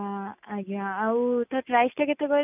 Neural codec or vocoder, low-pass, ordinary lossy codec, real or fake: none; 3.6 kHz; none; real